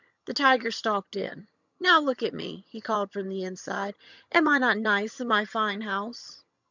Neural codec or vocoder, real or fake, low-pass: vocoder, 22.05 kHz, 80 mel bands, HiFi-GAN; fake; 7.2 kHz